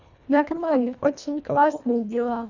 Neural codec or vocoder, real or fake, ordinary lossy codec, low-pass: codec, 24 kHz, 1.5 kbps, HILCodec; fake; none; 7.2 kHz